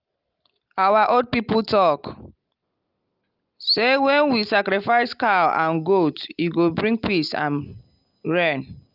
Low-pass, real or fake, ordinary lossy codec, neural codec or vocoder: 5.4 kHz; real; Opus, 32 kbps; none